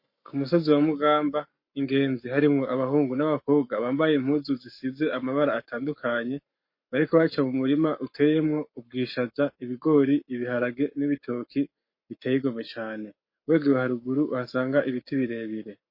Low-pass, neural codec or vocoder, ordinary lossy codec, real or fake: 5.4 kHz; codec, 44.1 kHz, 7.8 kbps, Pupu-Codec; MP3, 32 kbps; fake